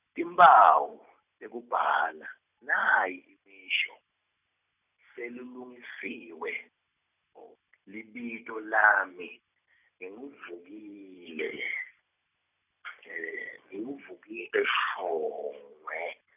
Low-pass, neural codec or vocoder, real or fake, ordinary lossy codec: 3.6 kHz; none; real; none